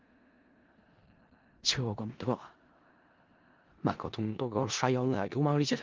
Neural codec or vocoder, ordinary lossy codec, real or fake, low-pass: codec, 16 kHz in and 24 kHz out, 0.4 kbps, LongCat-Audio-Codec, four codebook decoder; Opus, 32 kbps; fake; 7.2 kHz